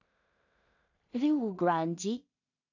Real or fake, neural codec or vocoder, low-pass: fake; codec, 16 kHz in and 24 kHz out, 0.4 kbps, LongCat-Audio-Codec, two codebook decoder; 7.2 kHz